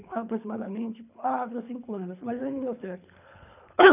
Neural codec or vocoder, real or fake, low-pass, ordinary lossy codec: codec, 24 kHz, 3 kbps, HILCodec; fake; 3.6 kHz; none